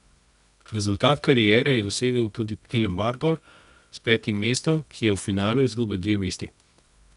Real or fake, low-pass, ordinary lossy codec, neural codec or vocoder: fake; 10.8 kHz; none; codec, 24 kHz, 0.9 kbps, WavTokenizer, medium music audio release